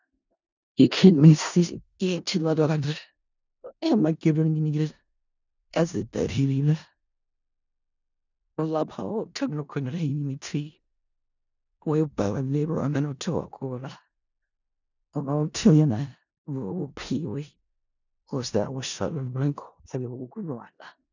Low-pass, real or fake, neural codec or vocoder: 7.2 kHz; fake; codec, 16 kHz in and 24 kHz out, 0.4 kbps, LongCat-Audio-Codec, four codebook decoder